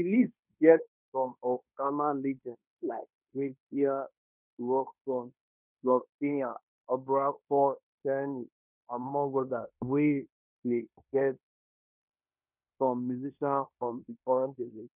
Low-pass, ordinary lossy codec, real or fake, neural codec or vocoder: 3.6 kHz; none; fake; codec, 16 kHz in and 24 kHz out, 0.9 kbps, LongCat-Audio-Codec, fine tuned four codebook decoder